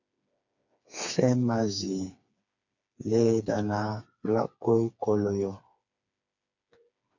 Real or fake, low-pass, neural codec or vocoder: fake; 7.2 kHz; codec, 16 kHz, 4 kbps, FreqCodec, smaller model